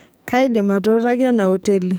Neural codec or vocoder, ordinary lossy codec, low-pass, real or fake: codec, 44.1 kHz, 2.6 kbps, SNAC; none; none; fake